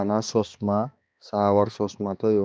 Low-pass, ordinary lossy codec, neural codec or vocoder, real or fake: none; none; codec, 16 kHz, 2 kbps, X-Codec, HuBERT features, trained on balanced general audio; fake